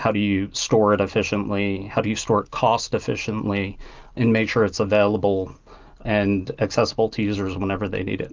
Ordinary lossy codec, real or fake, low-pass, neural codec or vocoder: Opus, 24 kbps; real; 7.2 kHz; none